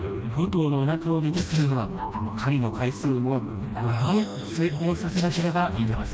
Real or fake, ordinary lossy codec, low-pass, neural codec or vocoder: fake; none; none; codec, 16 kHz, 1 kbps, FreqCodec, smaller model